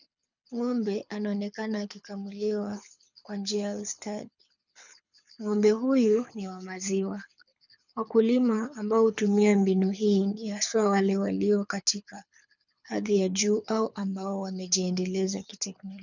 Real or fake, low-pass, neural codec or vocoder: fake; 7.2 kHz; codec, 24 kHz, 6 kbps, HILCodec